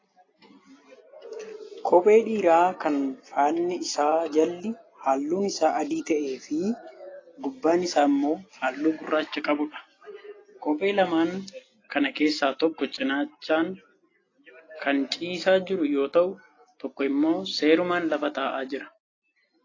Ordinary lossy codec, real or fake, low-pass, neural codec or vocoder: AAC, 32 kbps; real; 7.2 kHz; none